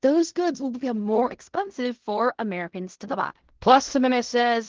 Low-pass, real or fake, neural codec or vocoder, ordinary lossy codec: 7.2 kHz; fake; codec, 16 kHz in and 24 kHz out, 0.4 kbps, LongCat-Audio-Codec, fine tuned four codebook decoder; Opus, 16 kbps